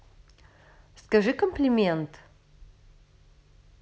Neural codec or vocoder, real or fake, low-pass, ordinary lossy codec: none; real; none; none